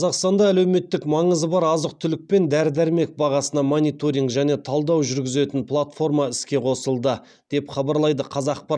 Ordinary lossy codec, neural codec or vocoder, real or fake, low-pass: none; none; real; 9.9 kHz